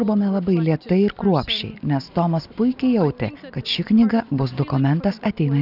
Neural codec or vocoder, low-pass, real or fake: none; 5.4 kHz; real